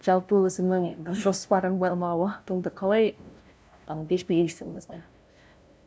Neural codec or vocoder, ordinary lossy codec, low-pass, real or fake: codec, 16 kHz, 0.5 kbps, FunCodec, trained on LibriTTS, 25 frames a second; none; none; fake